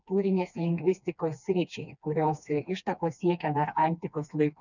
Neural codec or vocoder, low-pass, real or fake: codec, 16 kHz, 2 kbps, FreqCodec, smaller model; 7.2 kHz; fake